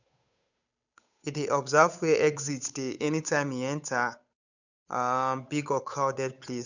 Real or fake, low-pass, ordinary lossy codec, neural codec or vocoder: fake; 7.2 kHz; none; codec, 16 kHz, 8 kbps, FunCodec, trained on Chinese and English, 25 frames a second